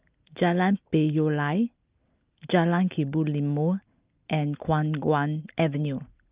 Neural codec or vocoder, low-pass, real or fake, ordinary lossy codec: none; 3.6 kHz; real; Opus, 32 kbps